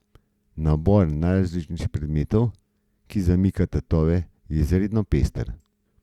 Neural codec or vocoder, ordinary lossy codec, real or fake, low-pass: none; Opus, 64 kbps; real; 19.8 kHz